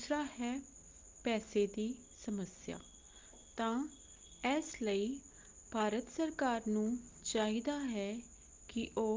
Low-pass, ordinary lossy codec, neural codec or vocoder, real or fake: 7.2 kHz; Opus, 32 kbps; none; real